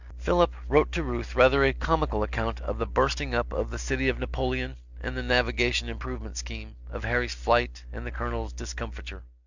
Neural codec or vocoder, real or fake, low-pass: none; real; 7.2 kHz